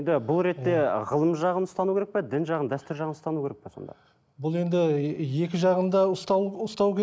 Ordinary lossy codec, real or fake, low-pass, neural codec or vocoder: none; real; none; none